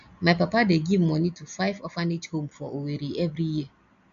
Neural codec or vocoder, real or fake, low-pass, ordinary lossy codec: none; real; 7.2 kHz; none